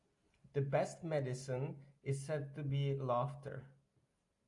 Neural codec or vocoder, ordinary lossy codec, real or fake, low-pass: none; AAC, 64 kbps; real; 10.8 kHz